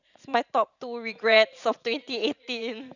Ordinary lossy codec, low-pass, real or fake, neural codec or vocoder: none; 7.2 kHz; fake; vocoder, 44.1 kHz, 80 mel bands, Vocos